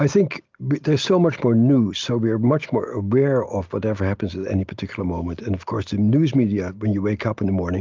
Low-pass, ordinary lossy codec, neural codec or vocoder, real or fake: 7.2 kHz; Opus, 32 kbps; none; real